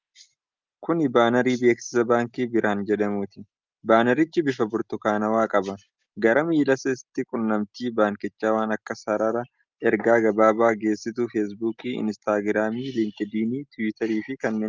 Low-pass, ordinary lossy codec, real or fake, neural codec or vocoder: 7.2 kHz; Opus, 24 kbps; real; none